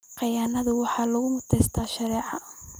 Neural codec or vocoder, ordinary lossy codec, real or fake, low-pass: none; none; real; none